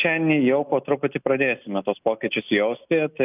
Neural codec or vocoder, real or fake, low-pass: none; real; 3.6 kHz